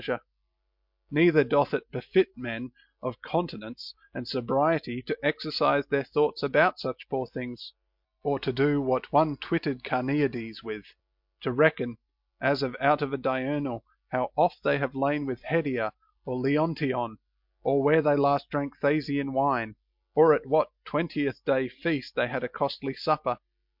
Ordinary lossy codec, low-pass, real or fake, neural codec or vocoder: MP3, 48 kbps; 5.4 kHz; real; none